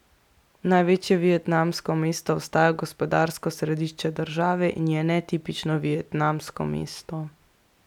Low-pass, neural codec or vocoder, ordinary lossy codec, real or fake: 19.8 kHz; none; none; real